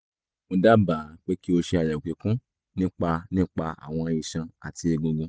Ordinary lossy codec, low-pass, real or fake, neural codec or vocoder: none; none; real; none